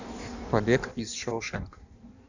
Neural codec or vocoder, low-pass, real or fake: codec, 16 kHz in and 24 kHz out, 1.1 kbps, FireRedTTS-2 codec; 7.2 kHz; fake